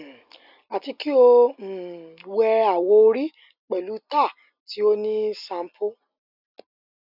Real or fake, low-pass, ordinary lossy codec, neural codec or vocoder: real; 5.4 kHz; none; none